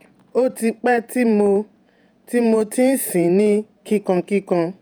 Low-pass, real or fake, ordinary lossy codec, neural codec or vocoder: none; fake; none; vocoder, 48 kHz, 128 mel bands, Vocos